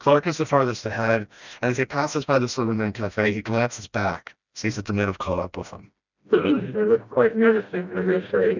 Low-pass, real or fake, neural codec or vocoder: 7.2 kHz; fake; codec, 16 kHz, 1 kbps, FreqCodec, smaller model